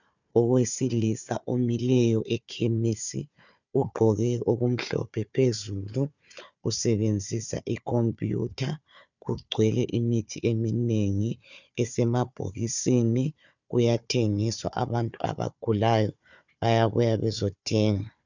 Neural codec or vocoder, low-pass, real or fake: codec, 16 kHz, 4 kbps, FunCodec, trained on Chinese and English, 50 frames a second; 7.2 kHz; fake